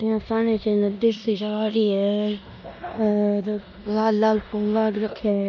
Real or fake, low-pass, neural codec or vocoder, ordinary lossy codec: fake; 7.2 kHz; codec, 16 kHz in and 24 kHz out, 0.9 kbps, LongCat-Audio-Codec, four codebook decoder; none